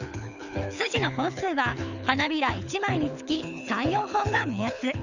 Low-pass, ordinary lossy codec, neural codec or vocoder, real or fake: 7.2 kHz; none; codec, 24 kHz, 6 kbps, HILCodec; fake